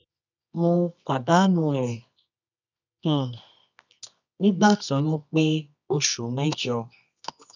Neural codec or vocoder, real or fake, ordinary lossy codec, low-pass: codec, 24 kHz, 0.9 kbps, WavTokenizer, medium music audio release; fake; none; 7.2 kHz